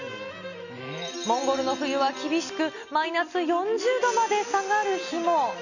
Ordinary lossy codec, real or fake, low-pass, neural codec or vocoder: none; real; 7.2 kHz; none